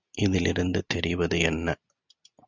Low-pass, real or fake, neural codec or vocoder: 7.2 kHz; real; none